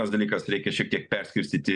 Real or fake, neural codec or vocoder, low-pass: real; none; 10.8 kHz